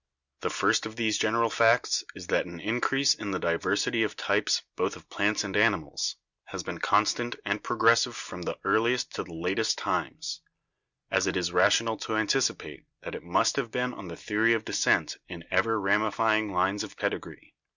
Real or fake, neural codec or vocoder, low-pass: real; none; 7.2 kHz